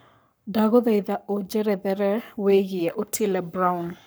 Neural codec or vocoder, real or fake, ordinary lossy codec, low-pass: codec, 44.1 kHz, 7.8 kbps, Pupu-Codec; fake; none; none